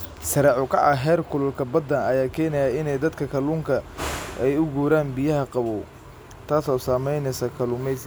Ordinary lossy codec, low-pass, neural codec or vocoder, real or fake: none; none; none; real